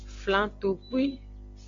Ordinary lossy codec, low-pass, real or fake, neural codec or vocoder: MP3, 48 kbps; 7.2 kHz; real; none